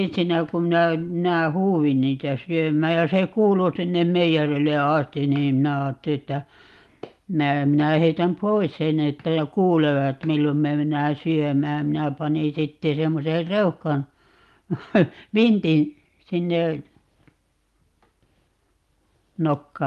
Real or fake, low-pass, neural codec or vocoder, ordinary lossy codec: real; 14.4 kHz; none; Opus, 32 kbps